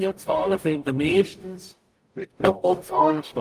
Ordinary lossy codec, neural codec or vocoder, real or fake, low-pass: Opus, 32 kbps; codec, 44.1 kHz, 0.9 kbps, DAC; fake; 14.4 kHz